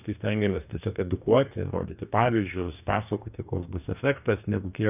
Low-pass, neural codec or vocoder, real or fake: 3.6 kHz; codec, 44.1 kHz, 2.6 kbps, DAC; fake